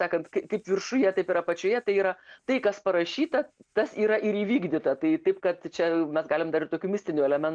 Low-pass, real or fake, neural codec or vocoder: 9.9 kHz; real; none